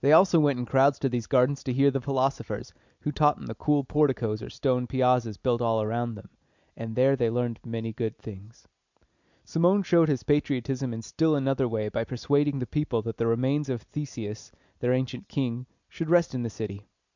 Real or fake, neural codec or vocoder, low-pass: real; none; 7.2 kHz